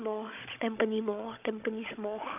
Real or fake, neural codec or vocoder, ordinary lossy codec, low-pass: fake; codec, 16 kHz, 16 kbps, FunCodec, trained on Chinese and English, 50 frames a second; none; 3.6 kHz